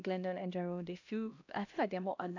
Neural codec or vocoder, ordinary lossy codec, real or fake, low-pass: codec, 16 kHz, 1 kbps, X-Codec, HuBERT features, trained on LibriSpeech; AAC, 48 kbps; fake; 7.2 kHz